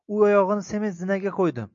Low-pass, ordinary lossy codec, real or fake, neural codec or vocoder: 7.2 kHz; MP3, 48 kbps; real; none